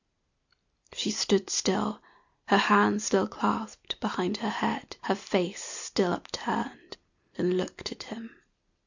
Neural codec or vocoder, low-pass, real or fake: none; 7.2 kHz; real